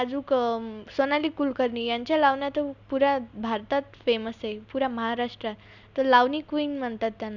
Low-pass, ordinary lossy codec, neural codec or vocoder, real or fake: 7.2 kHz; none; none; real